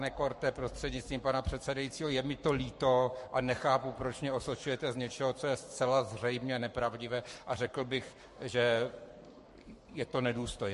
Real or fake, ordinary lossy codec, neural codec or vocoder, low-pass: fake; MP3, 48 kbps; codec, 44.1 kHz, 7.8 kbps, Pupu-Codec; 14.4 kHz